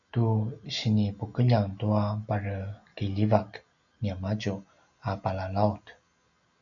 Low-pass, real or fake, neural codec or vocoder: 7.2 kHz; real; none